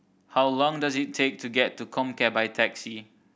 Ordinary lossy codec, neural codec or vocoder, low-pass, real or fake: none; none; none; real